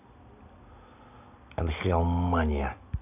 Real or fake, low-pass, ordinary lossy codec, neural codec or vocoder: real; 3.6 kHz; none; none